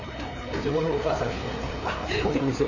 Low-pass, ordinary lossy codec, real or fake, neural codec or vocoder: 7.2 kHz; none; fake; codec, 16 kHz, 4 kbps, FreqCodec, larger model